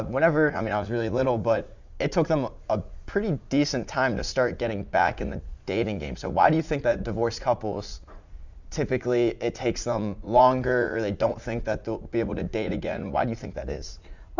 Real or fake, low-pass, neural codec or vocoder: fake; 7.2 kHz; vocoder, 44.1 kHz, 80 mel bands, Vocos